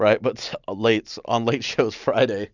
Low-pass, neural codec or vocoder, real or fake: 7.2 kHz; none; real